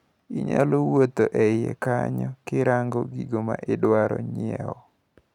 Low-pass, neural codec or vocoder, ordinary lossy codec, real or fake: 19.8 kHz; none; none; real